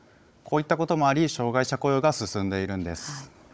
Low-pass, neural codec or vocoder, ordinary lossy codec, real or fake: none; codec, 16 kHz, 16 kbps, FunCodec, trained on Chinese and English, 50 frames a second; none; fake